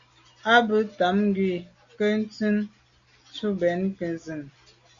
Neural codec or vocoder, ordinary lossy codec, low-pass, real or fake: none; Opus, 64 kbps; 7.2 kHz; real